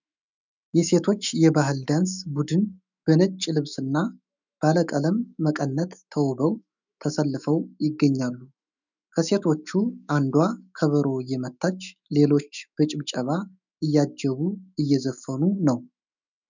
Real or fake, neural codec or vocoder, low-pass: fake; autoencoder, 48 kHz, 128 numbers a frame, DAC-VAE, trained on Japanese speech; 7.2 kHz